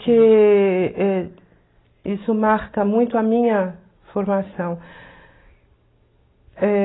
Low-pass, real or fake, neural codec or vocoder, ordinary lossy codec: 7.2 kHz; real; none; AAC, 16 kbps